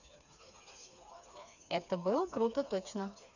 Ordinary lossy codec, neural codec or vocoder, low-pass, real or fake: none; codec, 16 kHz, 4 kbps, FreqCodec, smaller model; 7.2 kHz; fake